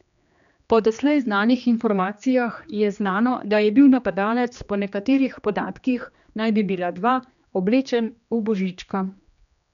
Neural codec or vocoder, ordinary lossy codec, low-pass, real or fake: codec, 16 kHz, 2 kbps, X-Codec, HuBERT features, trained on general audio; none; 7.2 kHz; fake